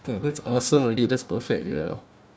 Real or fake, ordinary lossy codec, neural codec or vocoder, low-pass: fake; none; codec, 16 kHz, 1 kbps, FunCodec, trained on Chinese and English, 50 frames a second; none